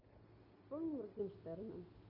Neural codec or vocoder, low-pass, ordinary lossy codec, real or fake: none; 5.4 kHz; MP3, 48 kbps; real